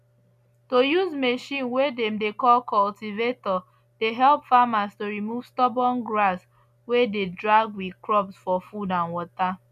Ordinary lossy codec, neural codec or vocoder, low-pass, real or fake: none; none; 14.4 kHz; real